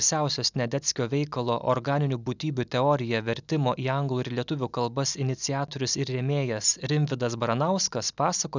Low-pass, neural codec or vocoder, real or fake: 7.2 kHz; none; real